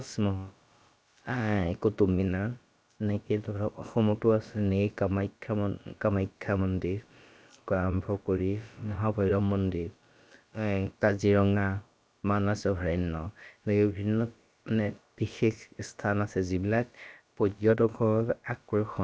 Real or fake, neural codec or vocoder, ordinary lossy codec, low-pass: fake; codec, 16 kHz, about 1 kbps, DyCAST, with the encoder's durations; none; none